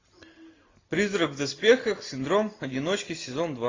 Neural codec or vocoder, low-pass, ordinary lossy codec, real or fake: none; 7.2 kHz; AAC, 32 kbps; real